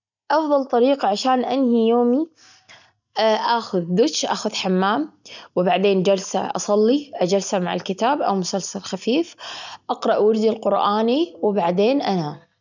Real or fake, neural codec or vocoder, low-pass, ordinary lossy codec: real; none; 7.2 kHz; none